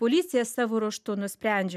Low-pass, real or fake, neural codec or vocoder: 14.4 kHz; real; none